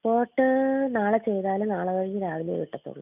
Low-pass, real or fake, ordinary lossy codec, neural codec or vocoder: 3.6 kHz; real; none; none